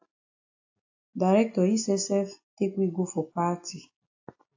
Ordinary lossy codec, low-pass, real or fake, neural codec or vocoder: AAC, 48 kbps; 7.2 kHz; real; none